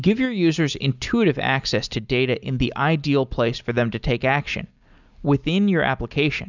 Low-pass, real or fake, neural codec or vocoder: 7.2 kHz; real; none